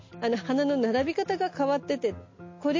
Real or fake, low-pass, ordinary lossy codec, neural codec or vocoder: real; 7.2 kHz; MP3, 32 kbps; none